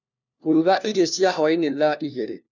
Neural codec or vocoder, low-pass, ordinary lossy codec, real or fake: codec, 16 kHz, 1 kbps, FunCodec, trained on LibriTTS, 50 frames a second; 7.2 kHz; none; fake